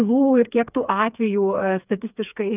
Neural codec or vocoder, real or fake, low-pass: codec, 16 kHz, 4 kbps, FreqCodec, smaller model; fake; 3.6 kHz